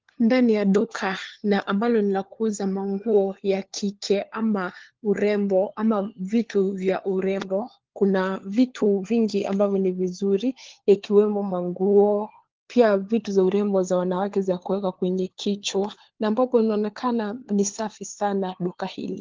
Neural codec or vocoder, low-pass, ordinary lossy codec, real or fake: codec, 16 kHz, 4 kbps, FunCodec, trained on LibriTTS, 50 frames a second; 7.2 kHz; Opus, 16 kbps; fake